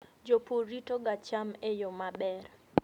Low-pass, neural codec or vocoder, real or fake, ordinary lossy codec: 19.8 kHz; none; real; none